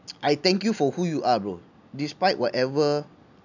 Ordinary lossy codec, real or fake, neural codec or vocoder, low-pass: none; real; none; 7.2 kHz